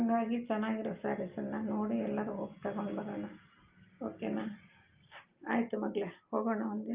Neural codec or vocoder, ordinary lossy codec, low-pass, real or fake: none; Opus, 24 kbps; 3.6 kHz; real